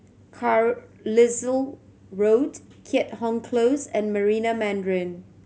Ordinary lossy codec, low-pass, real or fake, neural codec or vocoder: none; none; real; none